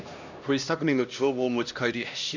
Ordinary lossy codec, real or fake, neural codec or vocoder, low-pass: none; fake; codec, 16 kHz, 1 kbps, X-Codec, HuBERT features, trained on LibriSpeech; 7.2 kHz